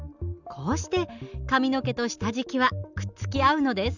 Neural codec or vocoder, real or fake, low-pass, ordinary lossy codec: none; real; 7.2 kHz; none